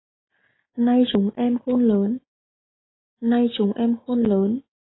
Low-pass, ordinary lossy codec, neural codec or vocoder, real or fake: 7.2 kHz; AAC, 16 kbps; none; real